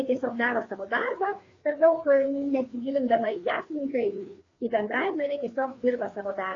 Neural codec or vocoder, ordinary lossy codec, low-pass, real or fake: codec, 16 kHz, 2 kbps, FreqCodec, larger model; AAC, 32 kbps; 7.2 kHz; fake